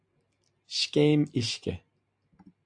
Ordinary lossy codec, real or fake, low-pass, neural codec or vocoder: AAC, 64 kbps; real; 9.9 kHz; none